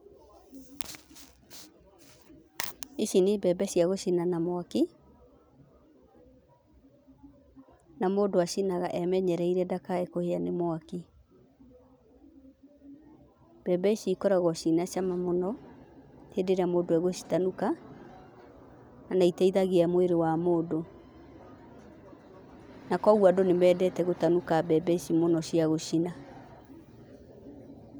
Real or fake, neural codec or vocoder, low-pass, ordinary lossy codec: real; none; none; none